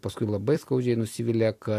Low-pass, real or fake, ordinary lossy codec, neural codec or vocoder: 14.4 kHz; fake; AAC, 64 kbps; vocoder, 44.1 kHz, 128 mel bands every 256 samples, BigVGAN v2